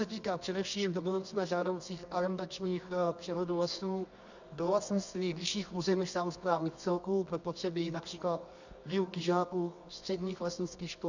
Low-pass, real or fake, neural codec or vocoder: 7.2 kHz; fake; codec, 24 kHz, 0.9 kbps, WavTokenizer, medium music audio release